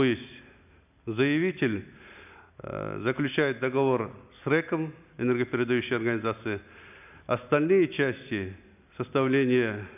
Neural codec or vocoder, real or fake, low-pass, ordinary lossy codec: none; real; 3.6 kHz; none